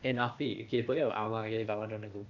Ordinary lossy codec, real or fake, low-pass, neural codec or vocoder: none; fake; 7.2 kHz; codec, 16 kHz, 0.8 kbps, ZipCodec